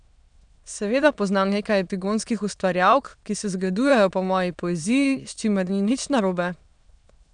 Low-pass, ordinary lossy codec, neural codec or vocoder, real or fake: 9.9 kHz; none; autoencoder, 22.05 kHz, a latent of 192 numbers a frame, VITS, trained on many speakers; fake